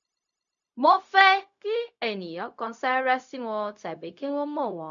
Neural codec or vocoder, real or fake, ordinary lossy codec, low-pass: codec, 16 kHz, 0.4 kbps, LongCat-Audio-Codec; fake; none; 7.2 kHz